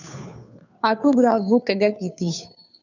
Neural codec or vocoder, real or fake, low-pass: codec, 16 kHz, 2 kbps, FunCodec, trained on Chinese and English, 25 frames a second; fake; 7.2 kHz